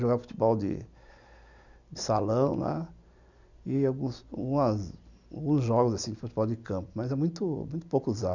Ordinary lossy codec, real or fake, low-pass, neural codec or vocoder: none; real; 7.2 kHz; none